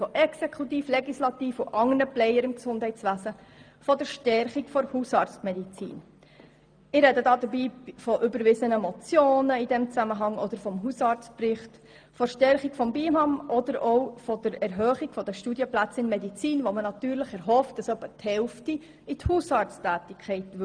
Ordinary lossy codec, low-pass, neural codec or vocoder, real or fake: Opus, 32 kbps; 9.9 kHz; none; real